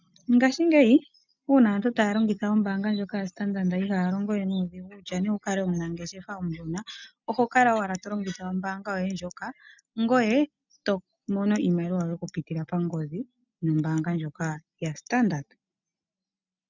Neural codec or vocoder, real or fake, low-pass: none; real; 7.2 kHz